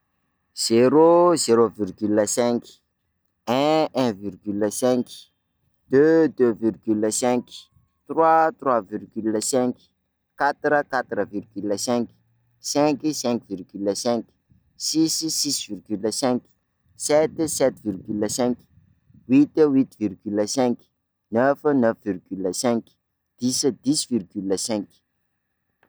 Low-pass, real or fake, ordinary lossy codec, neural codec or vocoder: none; real; none; none